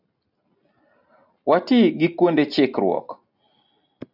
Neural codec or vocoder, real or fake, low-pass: none; real; 5.4 kHz